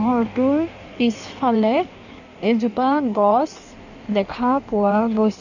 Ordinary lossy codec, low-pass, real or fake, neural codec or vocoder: none; 7.2 kHz; fake; codec, 16 kHz in and 24 kHz out, 1.1 kbps, FireRedTTS-2 codec